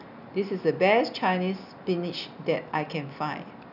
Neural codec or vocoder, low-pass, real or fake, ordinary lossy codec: none; 5.4 kHz; real; none